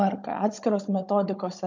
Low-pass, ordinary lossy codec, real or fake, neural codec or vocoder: 7.2 kHz; MP3, 64 kbps; fake; codec, 16 kHz, 16 kbps, FunCodec, trained on Chinese and English, 50 frames a second